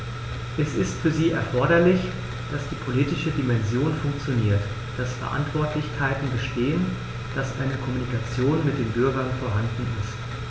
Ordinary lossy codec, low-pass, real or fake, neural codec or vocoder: none; none; real; none